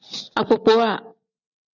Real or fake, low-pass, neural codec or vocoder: real; 7.2 kHz; none